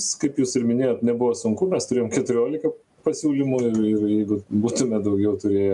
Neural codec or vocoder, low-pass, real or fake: none; 10.8 kHz; real